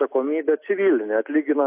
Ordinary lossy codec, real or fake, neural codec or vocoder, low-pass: AAC, 32 kbps; real; none; 3.6 kHz